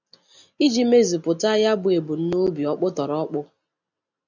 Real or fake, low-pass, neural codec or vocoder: real; 7.2 kHz; none